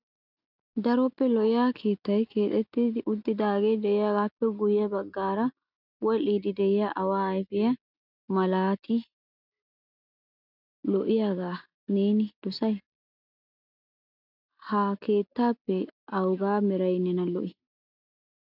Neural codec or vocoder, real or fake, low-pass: none; real; 5.4 kHz